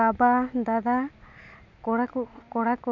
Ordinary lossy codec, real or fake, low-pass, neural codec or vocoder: none; real; 7.2 kHz; none